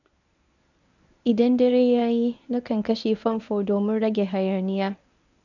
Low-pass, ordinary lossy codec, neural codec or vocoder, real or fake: 7.2 kHz; none; codec, 24 kHz, 0.9 kbps, WavTokenizer, medium speech release version 1; fake